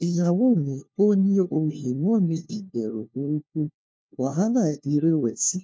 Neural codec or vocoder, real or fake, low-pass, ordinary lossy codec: codec, 16 kHz, 1 kbps, FunCodec, trained on LibriTTS, 50 frames a second; fake; none; none